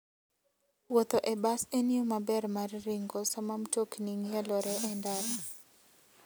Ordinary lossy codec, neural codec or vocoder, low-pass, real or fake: none; none; none; real